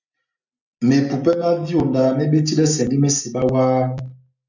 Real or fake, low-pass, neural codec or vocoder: real; 7.2 kHz; none